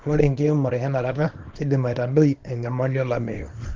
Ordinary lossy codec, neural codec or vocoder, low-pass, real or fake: Opus, 16 kbps; codec, 24 kHz, 0.9 kbps, WavTokenizer, small release; 7.2 kHz; fake